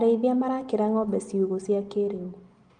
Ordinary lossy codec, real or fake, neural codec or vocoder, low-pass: Opus, 24 kbps; real; none; 9.9 kHz